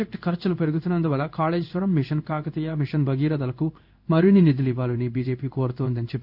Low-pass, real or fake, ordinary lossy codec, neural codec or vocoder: 5.4 kHz; fake; none; codec, 16 kHz in and 24 kHz out, 1 kbps, XY-Tokenizer